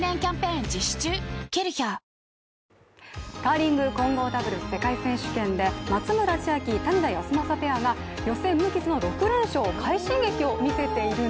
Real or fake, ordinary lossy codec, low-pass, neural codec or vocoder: real; none; none; none